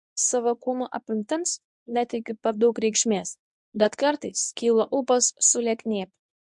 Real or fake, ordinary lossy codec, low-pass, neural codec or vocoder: fake; MP3, 64 kbps; 10.8 kHz; codec, 24 kHz, 0.9 kbps, WavTokenizer, medium speech release version 1